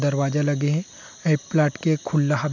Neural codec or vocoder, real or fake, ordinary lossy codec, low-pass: none; real; none; 7.2 kHz